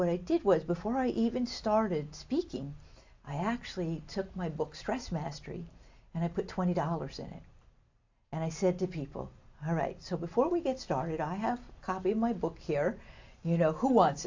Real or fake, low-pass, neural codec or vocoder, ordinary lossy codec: real; 7.2 kHz; none; AAC, 48 kbps